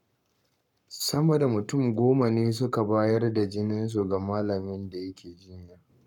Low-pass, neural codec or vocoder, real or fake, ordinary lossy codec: 19.8 kHz; codec, 44.1 kHz, 7.8 kbps, Pupu-Codec; fake; none